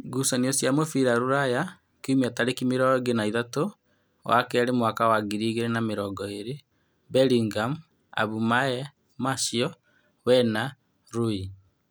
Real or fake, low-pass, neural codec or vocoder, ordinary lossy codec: real; none; none; none